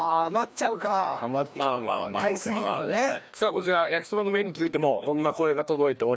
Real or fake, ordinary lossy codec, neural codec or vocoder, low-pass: fake; none; codec, 16 kHz, 1 kbps, FreqCodec, larger model; none